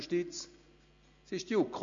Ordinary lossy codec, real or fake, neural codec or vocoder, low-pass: AAC, 64 kbps; real; none; 7.2 kHz